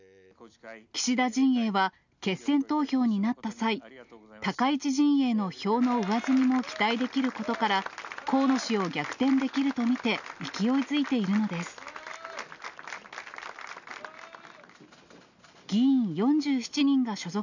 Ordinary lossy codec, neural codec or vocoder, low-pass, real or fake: none; none; 7.2 kHz; real